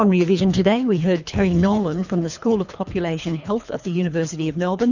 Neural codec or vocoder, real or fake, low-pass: codec, 24 kHz, 3 kbps, HILCodec; fake; 7.2 kHz